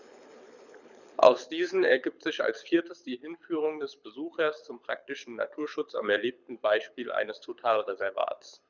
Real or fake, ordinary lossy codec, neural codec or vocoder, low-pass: fake; none; codec, 24 kHz, 6 kbps, HILCodec; 7.2 kHz